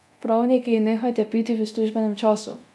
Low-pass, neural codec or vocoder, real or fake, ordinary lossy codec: none; codec, 24 kHz, 0.9 kbps, DualCodec; fake; none